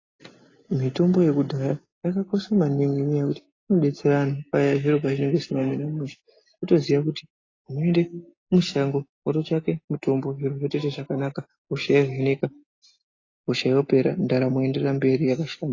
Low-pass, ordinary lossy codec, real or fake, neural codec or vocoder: 7.2 kHz; AAC, 32 kbps; real; none